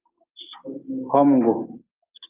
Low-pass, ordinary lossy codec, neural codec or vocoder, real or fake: 3.6 kHz; Opus, 32 kbps; none; real